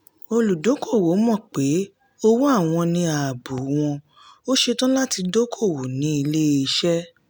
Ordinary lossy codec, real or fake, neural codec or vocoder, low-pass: none; real; none; none